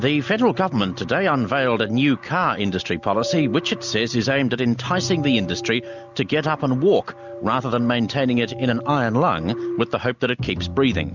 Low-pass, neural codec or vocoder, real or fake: 7.2 kHz; none; real